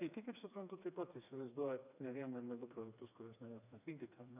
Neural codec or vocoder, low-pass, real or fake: codec, 16 kHz, 2 kbps, FreqCodec, smaller model; 3.6 kHz; fake